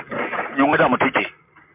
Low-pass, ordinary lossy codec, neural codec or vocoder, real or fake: 3.6 kHz; MP3, 32 kbps; none; real